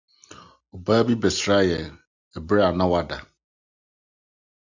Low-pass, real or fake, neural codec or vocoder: 7.2 kHz; real; none